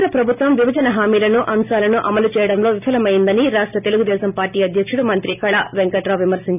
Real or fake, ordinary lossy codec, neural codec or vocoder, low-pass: real; none; none; 3.6 kHz